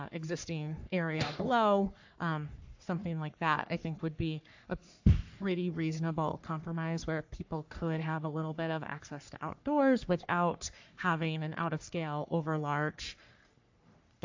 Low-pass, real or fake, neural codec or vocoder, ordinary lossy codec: 7.2 kHz; fake; codec, 44.1 kHz, 3.4 kbps, Pupu-Codec; AAC, 48 kbps